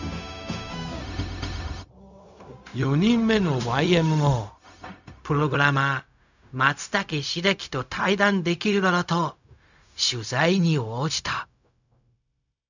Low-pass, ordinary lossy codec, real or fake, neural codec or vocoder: 7.2 kHz; none; fake; codec, 16 kHz, 0.4 kbps, LongCat-Audio-Codec